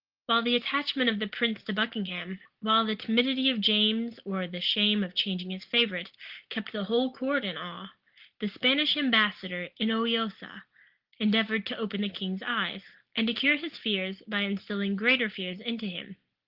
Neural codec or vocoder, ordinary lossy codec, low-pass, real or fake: none; Opus, 16 kbps; 5.4 kHz; real